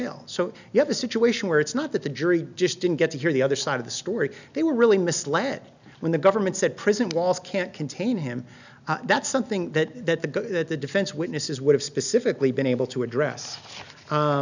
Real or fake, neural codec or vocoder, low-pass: real; none; 7.2 kHz